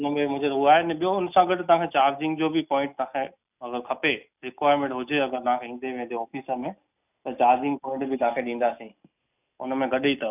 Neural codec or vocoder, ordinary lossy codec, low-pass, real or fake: none; none; 3.6 kHz; real